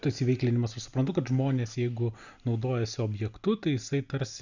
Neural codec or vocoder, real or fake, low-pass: none; real; 7.2 kHz